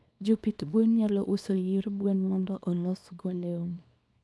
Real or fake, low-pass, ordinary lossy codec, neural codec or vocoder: fake; none; none; codec, 24 kHz, 0.9 kbps, WavTokenizer, small release